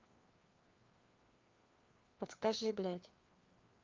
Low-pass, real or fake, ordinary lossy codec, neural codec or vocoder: 7.2 kHz; fake; Opus, 24 kbps; codec, 16 kHz, 2 kbps, FreqCodec, larger model